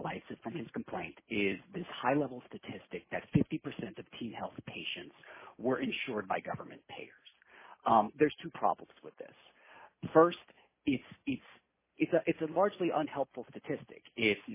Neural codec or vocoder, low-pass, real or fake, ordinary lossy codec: none; 3.6 kHz; real; MP3, 16 kbps